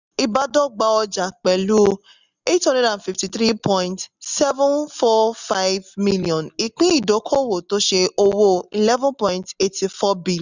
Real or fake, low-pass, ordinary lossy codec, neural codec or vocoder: real; 7.2 kHz; none; none